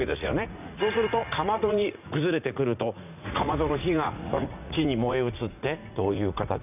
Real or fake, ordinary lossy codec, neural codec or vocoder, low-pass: fake; none; vocoder, 44.1 kHz, 80 mel bands, Vocos; 3.6 kHz